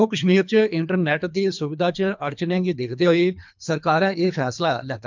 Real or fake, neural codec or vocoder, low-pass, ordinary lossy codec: fake; codec, 24 kHz, 3 kbps, HILCodec; 7.2 kHz; MP3, 64 kbps